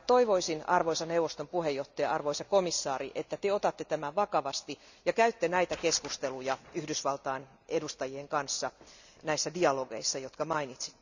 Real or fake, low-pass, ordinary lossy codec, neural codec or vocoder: real; 7.2 kHz; none; none